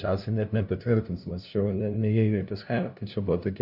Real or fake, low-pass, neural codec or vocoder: fake; 5.4 kHz; codec, 16 kHz, 0.5 kbps, FunCodec, trained on LibriTTS, 25 frames a second